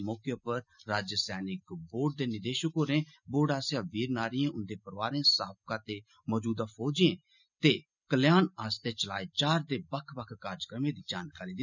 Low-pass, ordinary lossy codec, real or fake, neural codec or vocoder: 7.2 kHz; none; real; none